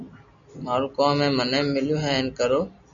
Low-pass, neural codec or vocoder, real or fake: 7.2 kHz; none; real